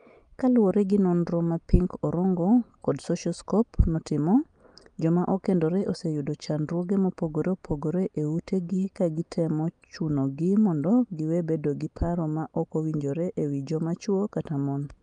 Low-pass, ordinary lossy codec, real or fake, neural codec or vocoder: 9.9 kHz; Opus, 32 kbps; real; none